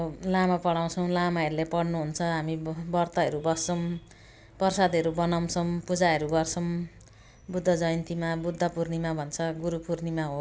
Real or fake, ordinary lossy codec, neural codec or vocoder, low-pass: real; none; none; none